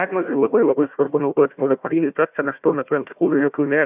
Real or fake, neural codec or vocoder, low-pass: fake; codec, 16 kHz, 1 kbps, FunCodec, trained on Chinese and English, 50 frames a second; 3.6 kHz